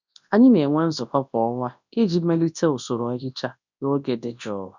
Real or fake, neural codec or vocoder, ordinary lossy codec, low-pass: fake; codec, 24 kHz, 0.9 kbps, WavTokenizer, large speech release; none; 7.2 kHz